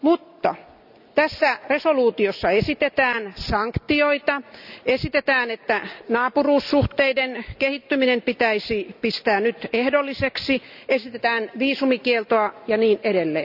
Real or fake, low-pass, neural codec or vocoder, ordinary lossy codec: real; 5.4 kHz; none; none